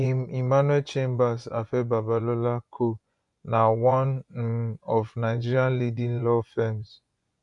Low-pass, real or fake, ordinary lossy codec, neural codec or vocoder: 10.8 kHz; fake; none; vocoder, 24 kHz, 100 mel bands, Vocos